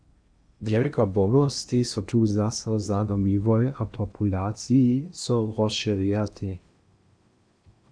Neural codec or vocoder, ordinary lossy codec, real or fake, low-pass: codec, 16 kHz in and 24 kHz out, 0.8 kbps, FocalCodec, streaming, 65536 codes; Opus, 64 kbps; fake; 9.9 kHz